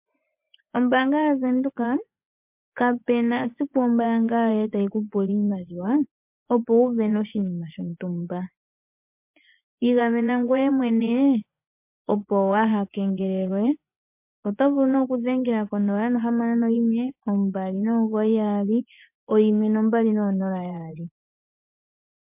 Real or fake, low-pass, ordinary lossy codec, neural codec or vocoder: fake; 3.6 kHz; MP3, 32 kbps; vocoder, 24 kHz, 100 mel bands, Vocos